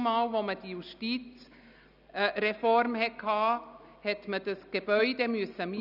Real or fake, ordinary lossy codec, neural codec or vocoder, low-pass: real; none; none; 5.4 kHz